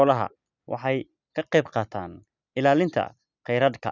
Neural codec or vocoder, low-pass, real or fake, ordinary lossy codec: none; 7.2 kHz; real; none